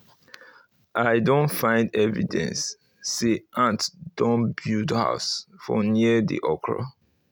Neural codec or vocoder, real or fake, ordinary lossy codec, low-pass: none; real; none; none